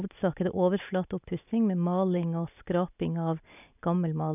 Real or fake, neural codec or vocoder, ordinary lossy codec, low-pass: real; none; none; 3.6 kHz